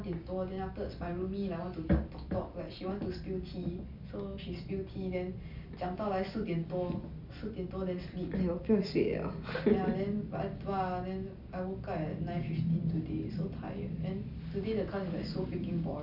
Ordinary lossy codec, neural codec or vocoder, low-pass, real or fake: none; none; 5.4 kHz; real